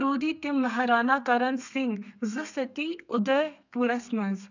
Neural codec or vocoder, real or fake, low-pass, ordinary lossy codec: codec, 32 kHz, 1.9 kbps, SNAC; fake; 7.2 kHz; none